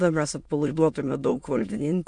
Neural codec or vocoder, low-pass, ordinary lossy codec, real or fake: autoencoder, 22.05 kHz, a latent of 192 numbers a frame, VITS, trained on many speakers; 9.9 kHz; MP3, 64 kbps; fake